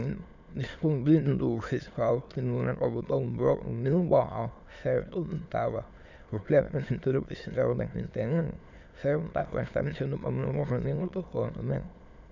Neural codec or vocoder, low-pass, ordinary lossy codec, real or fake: autoencoder, 22.05 kHz, a latent of 192 numbers a frame, VITS, trained on many speakers; 7.2 kHz; none; fake